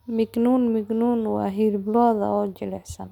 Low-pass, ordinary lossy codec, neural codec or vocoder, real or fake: 19.8 kHz; none; none; real